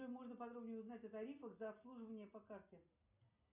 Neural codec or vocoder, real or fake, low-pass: none; real; 3.6 kHz